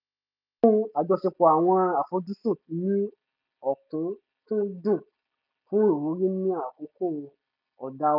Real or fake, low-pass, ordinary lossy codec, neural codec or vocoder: real; 5.4 kHz; none; none